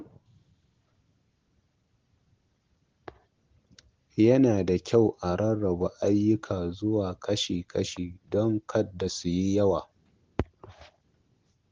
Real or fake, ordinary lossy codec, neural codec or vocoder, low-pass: real; Opus, 16 kbps; none; 7.2 kHz